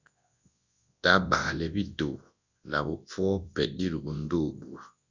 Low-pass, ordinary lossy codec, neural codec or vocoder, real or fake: 7.2 kHz; Opus, 64 kbps; codec, 24 kHz, 0.9 kbps, WavTokenizer, large speech release; fake